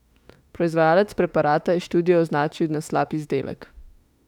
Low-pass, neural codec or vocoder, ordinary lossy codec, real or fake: 19.8 kHz; autoencoder, 48 kHz, 32 numbers a frame, DAC-VAE, trained on Japanese speech; none; fake